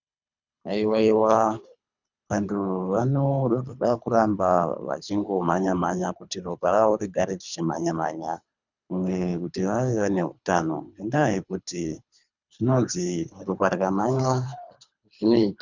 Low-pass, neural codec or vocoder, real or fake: 7.2 kHz; codec, 24 kHz, 3 kbps, HILCodec; fake